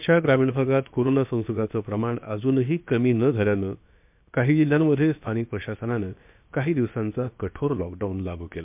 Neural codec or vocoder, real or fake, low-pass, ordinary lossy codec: codec, 16 kHz, about 1 kbps, DyCAST, with the encoder's durations; fake; 3.6 kHz; MP3, 32 kbps